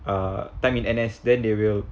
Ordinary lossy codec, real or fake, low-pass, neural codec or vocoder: none; real; none; none